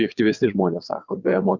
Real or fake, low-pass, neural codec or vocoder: fake; 7.2 kHz; vocoder, 22.05 kHz, 80 mel bands, WaveNeXt